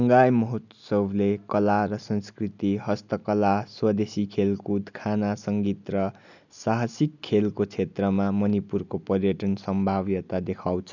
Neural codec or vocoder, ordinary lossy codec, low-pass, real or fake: none; none; 7.2 kHz; real